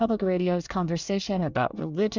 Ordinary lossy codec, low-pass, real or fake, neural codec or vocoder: Opus, 64 kbps; 7.2 kHz; fake; codec, 24 kHz, 1 kbps, SNAC